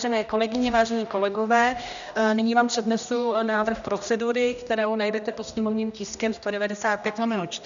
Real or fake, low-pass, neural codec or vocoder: fake; 7.2 kHz; codec, 16 kHz, 1 kbps, X-Codec, HuBERT features, trained on general audio